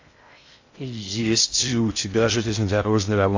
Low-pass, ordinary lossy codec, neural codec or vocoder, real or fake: 7.2 kHz; AAC, 48 kbps; codec, 16 kHz in and 24 kHz out, 0.6 kbps, FocalCodec, streaming, 2048 codes; fake